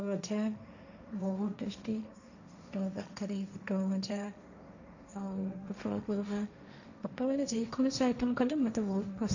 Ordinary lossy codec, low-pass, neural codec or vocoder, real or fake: none; 7.2 kHz; codec, 16 kHz, 1.1 kbps, Voila-Tokenizer; fake